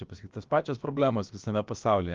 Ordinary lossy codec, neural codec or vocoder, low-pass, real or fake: Opus, 32 kbps; codec, 16 kHz, about 1 kbps, DyCAST, with the encoder's durations; 7.2 kHz; fake